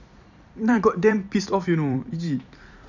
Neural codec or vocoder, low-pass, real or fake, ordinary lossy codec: vocoder, 44.1 kHz, 128 mel bands every 256 samples, BigVGAN v2; 7.2 kHz; fake; none